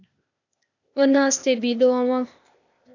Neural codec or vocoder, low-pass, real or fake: codec, 16 kHz, 0.8 kbps, ZipCodec; 7.2 kHz; fake